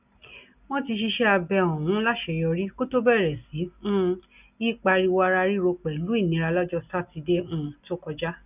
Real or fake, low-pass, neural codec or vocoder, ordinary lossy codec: real; 3.6 kHz; none; none